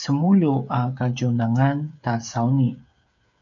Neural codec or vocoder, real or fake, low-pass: codec, 16 kHz, 8 kbps, FreqCodec, smaller model; fake; 7.2 kHz